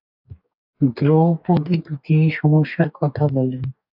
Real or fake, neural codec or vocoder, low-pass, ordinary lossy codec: fake; codec, 44.1 kHz, 2.6 kbps, DAC; 5.4 kHz; AAC, 48 kbps